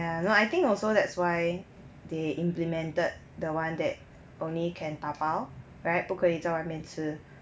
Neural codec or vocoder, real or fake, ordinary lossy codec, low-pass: none; real; none; none